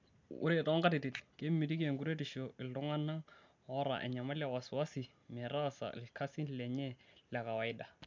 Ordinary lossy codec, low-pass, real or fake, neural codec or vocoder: MP3, 64 kbps; 7.2 kHz; real; none